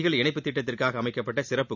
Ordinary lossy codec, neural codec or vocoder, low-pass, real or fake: none; none; 7.2 kHz; real